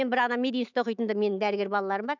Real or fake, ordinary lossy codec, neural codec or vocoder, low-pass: fake; none; autoencoder, 48 kHz, 128 numbers a frame, DAC-VAE, trained on Japanese speech; 7.2 kHz